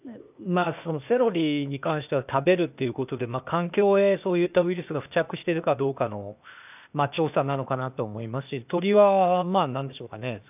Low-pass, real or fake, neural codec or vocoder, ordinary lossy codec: 3.6 kHz; fake; codec, 16 kHz, 0.8 kbps, ZipCodec; none